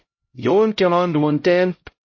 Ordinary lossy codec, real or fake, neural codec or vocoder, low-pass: MP3, 32 kbps; fake; codec, 16 kHz, 0.5 kbps, X-Codec, HuBERT features, trained on LibriSpeech; 7.2 kHz